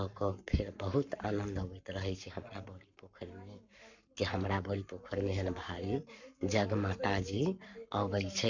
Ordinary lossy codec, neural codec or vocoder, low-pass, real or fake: none; codec, 44.1 kHz, 7.8 kbps, Pupu-Codec; 7.2 kHz; fake